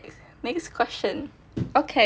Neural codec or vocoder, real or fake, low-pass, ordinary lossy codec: none; real; none; none